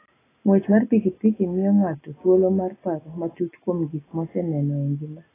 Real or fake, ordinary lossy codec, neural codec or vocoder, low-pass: real; AAC, 16 kbps; none; 3.6 kHz